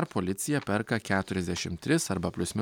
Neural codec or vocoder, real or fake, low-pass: none; real; 19.8 kHz